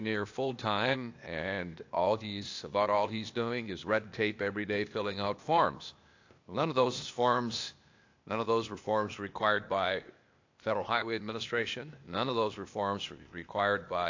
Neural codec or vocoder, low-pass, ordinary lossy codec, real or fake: codec, 16 kHz, 0.8 kbps, ZipCodec; 7.2 kHz; AAC, 48 kbps; fake